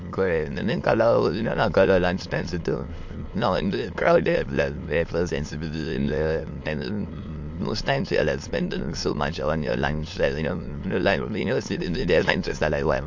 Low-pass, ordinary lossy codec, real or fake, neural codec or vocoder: 7.2 kHz; MP3, 48 kbps; fake; autoencoder, 22.05 kHz, a latent of 192 numbers a frame, VITS, trained on many speakers